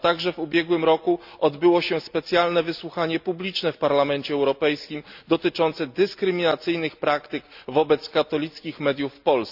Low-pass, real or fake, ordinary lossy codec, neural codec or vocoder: 5.4 kHz; real; none; none